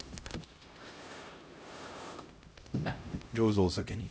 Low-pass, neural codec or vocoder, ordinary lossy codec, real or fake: none; codec, 16 kHz, 0.5 kbps, X-Codec, HuBERT features, trained on LibriSpeech; none; fake